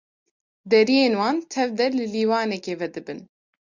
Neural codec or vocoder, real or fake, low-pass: none; real; 7.2 kHz